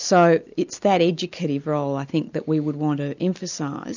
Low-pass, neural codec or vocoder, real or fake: 7.2 kHz; none; real